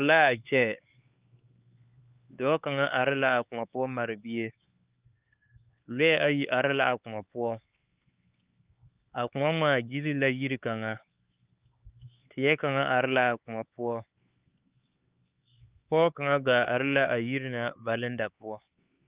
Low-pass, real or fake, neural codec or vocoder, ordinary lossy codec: 3.6 kHz; fake; codec, 16 kHz, 4 kbps, X-Codec, HuBERT features, trained on LibriSpeech; Opus, 32 kbps